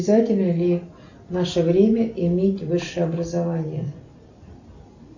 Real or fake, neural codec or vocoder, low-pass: real; none; 7.2 kHz